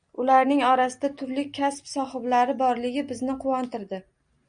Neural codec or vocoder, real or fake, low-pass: none; real; 9.9 kHz